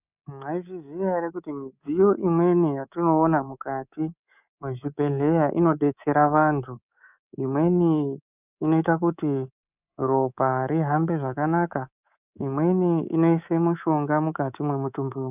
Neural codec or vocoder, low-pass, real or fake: codec, 24 kHz, 3.1 kbps, DualCodec; 3.6 kHz; fake